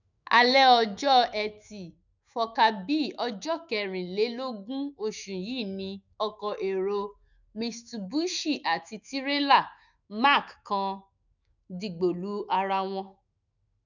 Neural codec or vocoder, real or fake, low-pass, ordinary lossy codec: autoencoder, 48 kHz, 128 numbers a frame, DAC-VAE, trained on Japanese speech; fake; 7.2 kHz; none